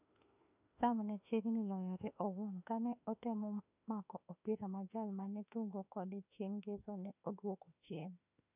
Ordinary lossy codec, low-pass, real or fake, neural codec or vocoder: none; 3.6 kHz; fake; autoencoder, 48 kHz, 32 numbers a frame, DAC-VAE, trained on Japanese speech